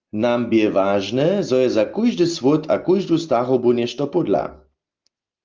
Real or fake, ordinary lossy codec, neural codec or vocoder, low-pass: real; Opus, 24 kbps; none; 7.2 kHz